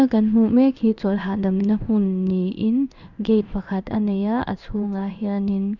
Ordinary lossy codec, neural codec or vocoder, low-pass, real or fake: none; codec, 16 kHz in and 24 kHz out, 1 kbps, XY-Tokenizer; 7.2 kHz; fake